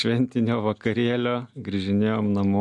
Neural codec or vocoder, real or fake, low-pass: none; real; 10.8 kHz